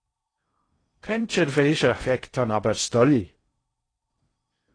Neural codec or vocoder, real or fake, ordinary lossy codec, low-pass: codec, 16 kHz in and 24 kHz out, 0.6 kbps, FocalCodec, streaming, 4096 codes; fake; AAC, 32 kbps; 9.9 kHz